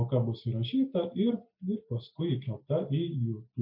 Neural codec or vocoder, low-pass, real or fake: none; 5.4 kHz; real